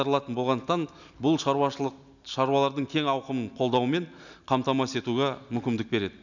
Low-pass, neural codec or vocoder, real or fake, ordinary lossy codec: 7.2 kHz; none; real; none